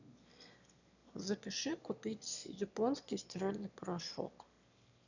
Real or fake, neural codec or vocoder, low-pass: fake; autoencoder, 22.05 kHz, a latent of 192 numbers a frame, VITS, trained on one speaker; 7.2 kHz